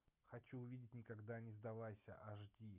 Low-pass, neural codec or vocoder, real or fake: 3.6 kHz; none; real